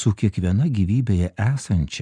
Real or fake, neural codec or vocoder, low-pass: real; none; 9.9 kHz